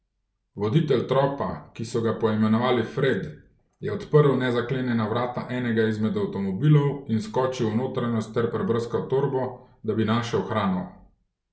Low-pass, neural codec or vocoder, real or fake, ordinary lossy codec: none; none; real; none